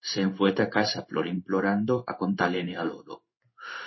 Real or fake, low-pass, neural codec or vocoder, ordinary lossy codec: fake; 7.2 kHz; codec, 16 kHz in and 24 kHz out, 1 kbps, XY-Tokenizer; MP3, 24 kbps